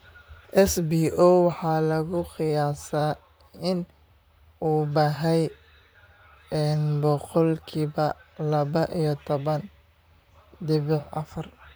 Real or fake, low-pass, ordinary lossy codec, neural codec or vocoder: fake; none; none; vocoder, 44.1 kHz, 128 mel bands, Pupu-Vocoder